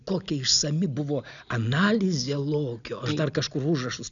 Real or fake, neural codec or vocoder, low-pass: real; none; 7.2 kHz